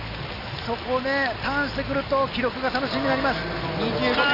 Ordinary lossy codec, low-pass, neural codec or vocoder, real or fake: none; 5.4 kHz; none; real